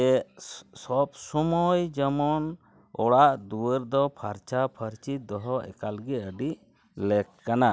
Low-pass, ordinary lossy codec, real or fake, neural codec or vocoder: none; none; real; none